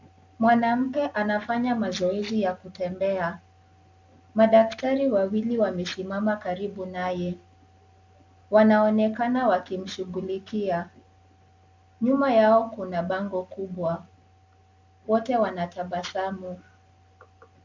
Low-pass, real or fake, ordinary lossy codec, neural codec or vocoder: 7.2 kHz; real; MP3, 64 kbps; none